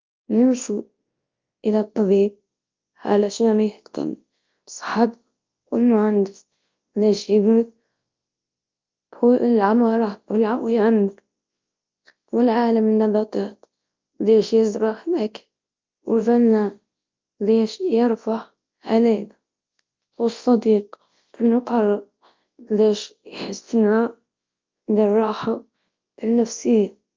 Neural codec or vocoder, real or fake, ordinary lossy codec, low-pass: codec, 24 kHz, 0.9 kbps, WavTokenizer, large speech release; fake; Opus, 24 kbps; 7.2 kHz